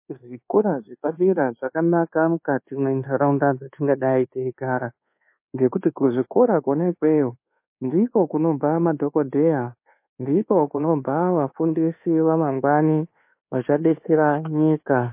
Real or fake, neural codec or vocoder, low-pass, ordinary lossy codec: fake; codec, 24 kHz, 1.2 kbps, DualCodec; 3.6 kHz; MP3, 24 kbps